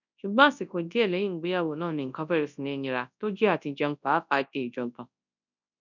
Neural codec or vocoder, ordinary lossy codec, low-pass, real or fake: codec, 24 kHz, 0.9 kbps, WavTokenizer, large speech release; none; 7.2 kHz; fake